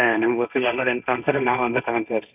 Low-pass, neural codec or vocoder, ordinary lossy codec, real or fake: 3.6 kHz; codec, 16 kHz, 1.1 kbps, Voila-Tokenizer; none; fake